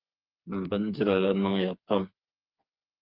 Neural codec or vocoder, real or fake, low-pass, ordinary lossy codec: codec, 16 kHz, 4 kbps, FreqCodec, smaller model; fake; 5.4 kHz; Opus, 24 kbps